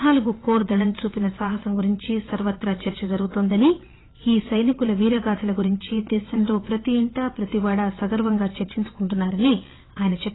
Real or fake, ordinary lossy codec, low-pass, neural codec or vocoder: fake; AAC, 16 kbps; 7.2 kHz; vocoder, 22.05 kHz, 80 mel bands, WaveNeXt